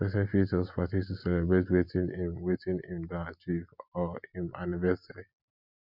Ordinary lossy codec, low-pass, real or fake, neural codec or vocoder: none; 5.4 kHz; real; none